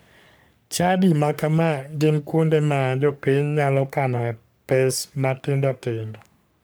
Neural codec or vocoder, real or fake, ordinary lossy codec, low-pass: codec, 44.1 kHz, 3.4 kbps, Pupu-Codec; fake; none; none